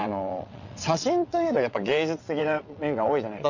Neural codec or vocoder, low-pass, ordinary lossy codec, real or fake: vocoder, 22.05 kHz, 80 mel bands, WaveNeXt; 7.2 kHz; none; fake